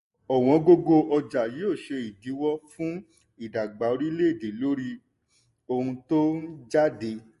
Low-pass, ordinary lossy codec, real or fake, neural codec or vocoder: 14.4 kHz; MP3, 48 kbps; real; none